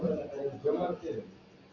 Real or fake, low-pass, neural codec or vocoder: real; 7.2 kHz; none